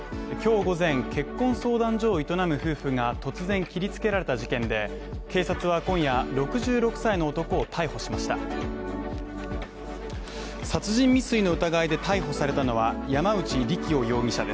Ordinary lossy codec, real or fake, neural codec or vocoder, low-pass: none; real; none; none